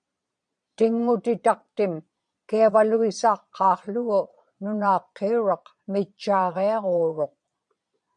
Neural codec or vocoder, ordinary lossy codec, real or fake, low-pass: vocoder, 22.05 kHz, 80 mel bands, Vocos; MP3, 96 kbps; fake; 9.9 kHz